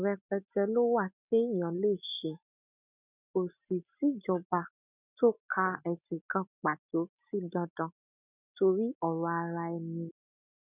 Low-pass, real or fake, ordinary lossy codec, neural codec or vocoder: 3.6 kHz; real; none; none